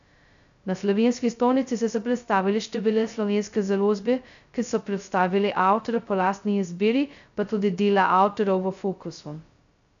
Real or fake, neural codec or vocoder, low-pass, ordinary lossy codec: fake; codec, 16 kHz, 0.2 kbps, FocalCodec; 7.2 kHz; none